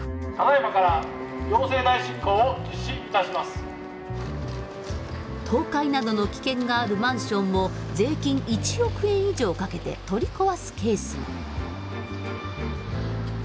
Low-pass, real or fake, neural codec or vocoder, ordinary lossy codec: none; real; none; none